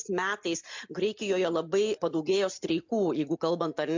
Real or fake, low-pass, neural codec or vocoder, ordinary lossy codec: real; 7.2 kHz; none; MP3, 64 kbps